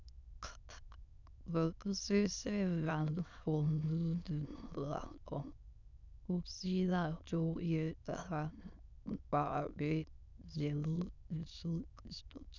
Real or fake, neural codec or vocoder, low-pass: fake; autoencoder, 22.05 kHz, a latent of 192 numbers a frame, VITS, trained on many speakers; 7.2 kHz